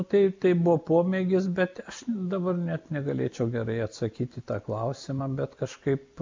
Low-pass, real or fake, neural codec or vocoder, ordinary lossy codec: 7.2 kHz; real; none; MP3, 64 kbps